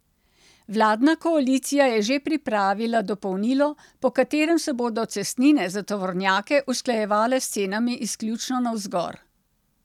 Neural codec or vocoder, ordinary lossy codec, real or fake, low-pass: none; none; real; 19.8 kHz